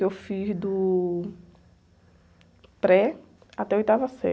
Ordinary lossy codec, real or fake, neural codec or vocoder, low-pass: none; real; none; none